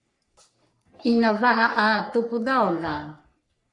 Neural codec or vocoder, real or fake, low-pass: codec, 44.1 kHz, 3.4 kbps, Pupu-Codec; fake; 10.8 kHz